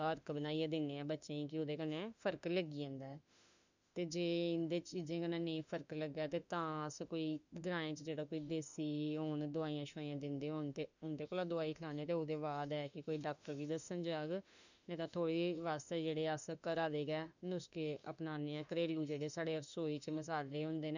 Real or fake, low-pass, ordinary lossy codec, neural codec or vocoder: fake; 7.2 kHz; none; autoencoder, 48 kHz, 32 numbers a frame, DAC-VAE, trained on Japanese speech